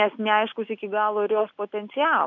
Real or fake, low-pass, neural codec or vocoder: real; 7.2 kHz; none